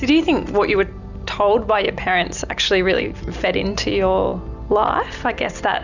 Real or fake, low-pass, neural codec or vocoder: real; 7.2 kHz; none